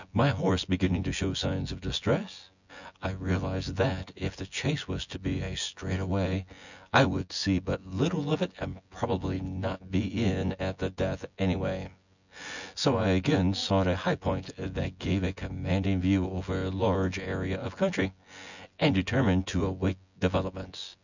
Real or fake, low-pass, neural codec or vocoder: fake; 7.2 kHz; vocoder, 24 kHz, 100 mel bands, Vocos